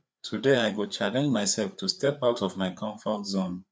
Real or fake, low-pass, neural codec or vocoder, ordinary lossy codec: fake; none; codec, 16 kHz, 4 kbps, FreqCodec, larger model; none